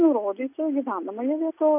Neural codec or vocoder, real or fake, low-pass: none; real; 3.6 kHz